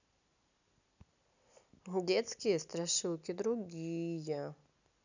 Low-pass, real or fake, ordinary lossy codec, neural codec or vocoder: 7.2 kHz; real; none; none